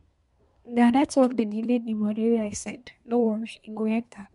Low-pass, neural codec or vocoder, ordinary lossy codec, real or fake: 10.8 kHz; codec, 24 kHz, 1 kbps, SNAC; MP3, 64 kbps; fake